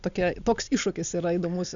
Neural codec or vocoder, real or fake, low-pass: none; real; 7.2 kHz